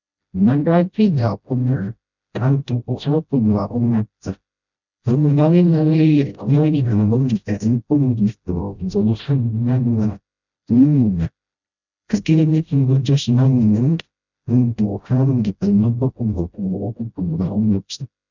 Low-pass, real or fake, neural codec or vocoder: 7.2 kHz; fake; codec, 16 kHz, 0.5 kbps, FreqCodec, smaller model